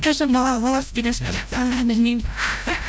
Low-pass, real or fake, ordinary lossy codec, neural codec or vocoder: none; fake; none; codec, 16 kHz, 0.5 kbps, FreqCodec, larger model